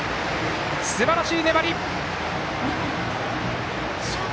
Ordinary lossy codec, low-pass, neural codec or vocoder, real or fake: none; none; none; real